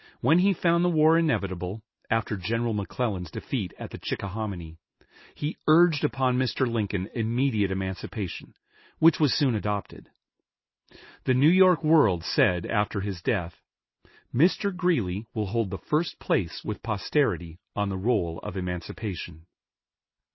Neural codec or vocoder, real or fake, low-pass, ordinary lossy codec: none; real; 7.2 kHz; MP3, 24 kbps